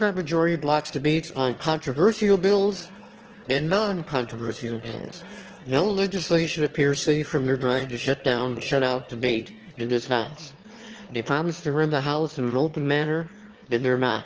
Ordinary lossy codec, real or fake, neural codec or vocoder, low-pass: Opus, 16 kbps; fake; autoencoder, 22.05 kHz, a latent of 192 numbers a frame, VITS, trained on one speaker; 7.2 kHz